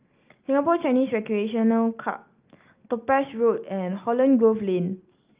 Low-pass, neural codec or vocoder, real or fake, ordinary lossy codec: 3.6 kHz; none; real; Opus, 24 kbps